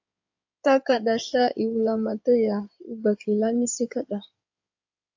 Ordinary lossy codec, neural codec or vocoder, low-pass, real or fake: AAC, 48 kbps; codec, 16 kHz in and 24 kHz out, 2.2 kbps, FireRedTTS-2 codec; 7.2 kHz; fake